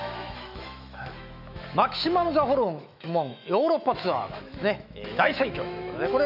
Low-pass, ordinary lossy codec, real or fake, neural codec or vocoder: 5.4 kHz; none; fake; autoencoder, 48 kHz, 128 numbers a frame, DAC-VAE, trained on Japanese speech